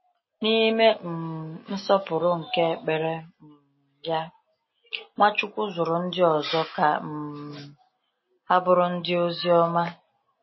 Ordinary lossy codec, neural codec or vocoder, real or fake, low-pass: MP3, 24 kbps; none; real; 7.2 kHz